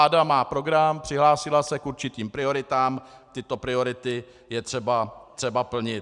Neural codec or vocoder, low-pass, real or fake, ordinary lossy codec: none; 10.8 kHz; real; Opus, 64 kbps